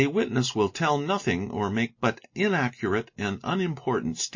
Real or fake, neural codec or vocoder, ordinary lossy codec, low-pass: real; none; MP3, 32 kbps; 7.2 kHz